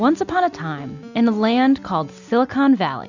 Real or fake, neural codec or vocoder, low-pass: real; none; 7.2 kHz